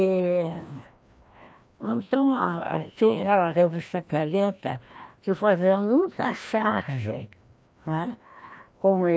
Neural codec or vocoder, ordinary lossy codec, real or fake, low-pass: codec, 16 kHz, 1 kbps, FreqCodec, larger model; none; fake; none